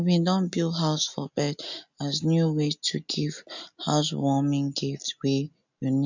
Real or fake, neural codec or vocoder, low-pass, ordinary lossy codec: real; none; 7.2 kHz; none